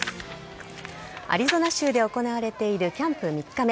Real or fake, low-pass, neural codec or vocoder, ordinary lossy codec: real; none; none; none